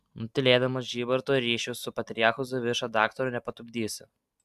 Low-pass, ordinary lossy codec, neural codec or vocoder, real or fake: 14.4 kHz; AAC, 96 kbps; none; real